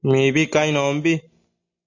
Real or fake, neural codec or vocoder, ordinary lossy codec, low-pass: real; none; AAC, 48 kbps; 7.2 kHz